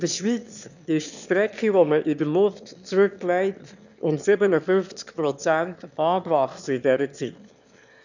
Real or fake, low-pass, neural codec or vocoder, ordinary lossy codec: fake; 7.2 kHz; autoencoder, 22.05 kHz, a latent of 192 numbers a frame, VITS, trained on one speaker; none